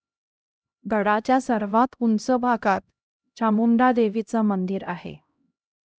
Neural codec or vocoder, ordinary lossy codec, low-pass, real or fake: codec, 16 kHz, 0.5 kbps, X-Codec, HuBERT features, trained on LibriSpeech; none; none; fake